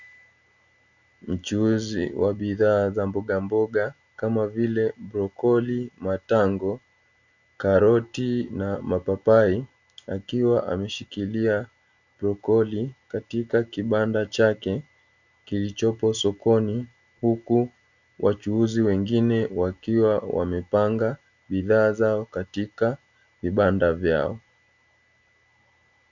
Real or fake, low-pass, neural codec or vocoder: real; 7.2 kHz; none